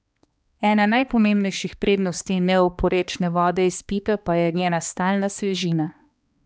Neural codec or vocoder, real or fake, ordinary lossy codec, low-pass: codec, 16 kHz, 2 kbps, X-Codec, HuBERT features, trained on balanced general audio; fake; none; none